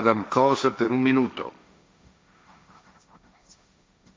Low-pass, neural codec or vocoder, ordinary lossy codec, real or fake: none; codec, 16 kHz, 1.1 kbps, Voila-Tokenizer; none; fake